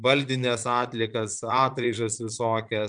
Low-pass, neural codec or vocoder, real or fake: 9.9 kHz; vocoder, 22.05 kHz, 80 mel bands, Vocos; fake